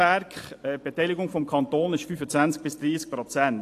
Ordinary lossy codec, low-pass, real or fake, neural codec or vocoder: AAC, 64 kbps; 14.4 kHz; fake; vocoder, 44.1 kHz, 128 mel bands every 512 samples, BigVGAN v2